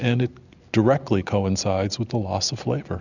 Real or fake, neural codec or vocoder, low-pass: real; none; 7.2 kHz